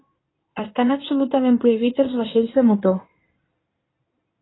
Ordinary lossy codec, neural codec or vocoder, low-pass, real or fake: AAC, 16 kbps; codec, 16 kHz in and 24 kHz out, 2.2 kbps, FireRedTTS-2 codec; 7.2 kHz; fake